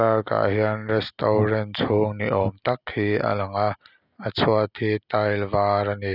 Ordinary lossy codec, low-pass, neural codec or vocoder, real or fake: none; 5.4 kHz; none; real